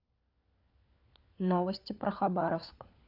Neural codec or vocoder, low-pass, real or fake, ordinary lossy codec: codec, 16 kHz, 4 kbps, FunCodec, trained on LibriTTS, 50 frames a second; 5.4 kHz; fake; none